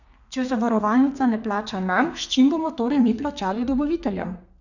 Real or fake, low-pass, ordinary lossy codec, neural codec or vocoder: fake; 7.2 kHz; none; codec, 32 kHz, 1.9 kbps, SNAC